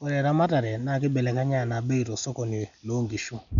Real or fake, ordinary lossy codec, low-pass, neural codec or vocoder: real; none; 7.2 kHz; none